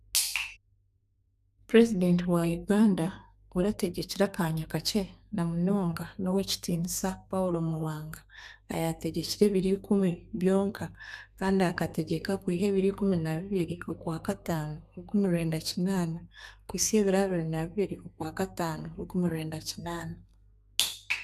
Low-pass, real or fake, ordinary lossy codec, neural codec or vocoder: 14.4 kHz; fake; none; codec, 32 kHz, 1.9 kbps, SNAC